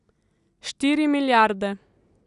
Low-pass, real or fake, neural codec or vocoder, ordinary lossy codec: 10.8 kHz; real; none; none